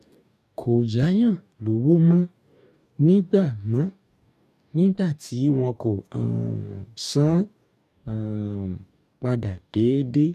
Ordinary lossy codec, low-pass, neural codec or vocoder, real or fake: none; 14.4 kHz; codec, 44.1 kHz, 2.6 kbps, DAC; fake